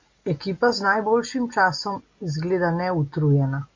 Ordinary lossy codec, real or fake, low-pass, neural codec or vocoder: MP3, 48 kbps; real; 7.2 kHz; none